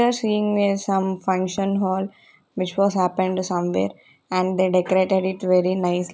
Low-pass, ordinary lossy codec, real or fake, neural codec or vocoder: none; none; real; none